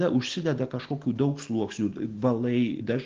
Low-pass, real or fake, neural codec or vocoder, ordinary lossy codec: 7.2 kHz; real; none; Opus, 16 kbps